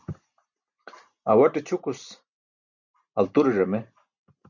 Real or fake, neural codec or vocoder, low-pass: real; none; 7.2 kHz